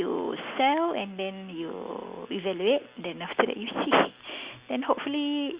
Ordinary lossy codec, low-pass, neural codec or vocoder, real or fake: Opus, 64 kbps; 3.6 kHz; none; real